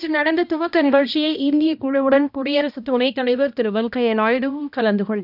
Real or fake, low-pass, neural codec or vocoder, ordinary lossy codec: fake; 5.4 kHz; codec, 16 kHz, 1 kbps, X-Codec, HuBERT features, trained on balanced general audio; none